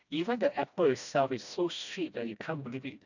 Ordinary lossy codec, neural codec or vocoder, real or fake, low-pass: none; codec, 16 kHz, 1 kbps, FreqCodec, smaller model; fake; 7.2 kHz